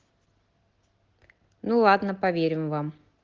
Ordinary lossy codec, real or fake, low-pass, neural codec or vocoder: Opus, 16 kbps; real; 7.2 kHz; none